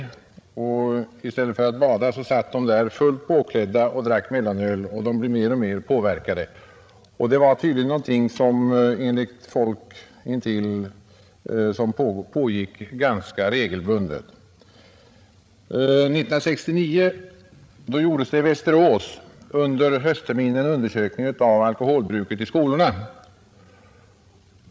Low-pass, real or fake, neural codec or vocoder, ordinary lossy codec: none; fake; codec, 16 kHz, 16 kbps, FreqCodec, larger model; none